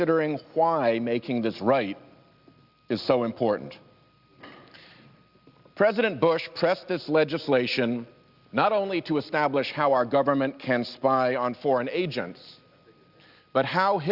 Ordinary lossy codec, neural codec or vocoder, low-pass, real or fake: Opus, 64 kbps; none; 5.4 kHz; real